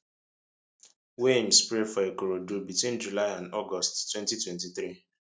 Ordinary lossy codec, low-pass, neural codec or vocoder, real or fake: none; none; none; real